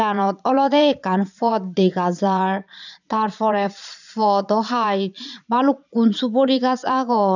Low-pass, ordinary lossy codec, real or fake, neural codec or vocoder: 7.2 kHz; none; fake; vocoder, 22.05 kHz, 80 mel bands, Vocos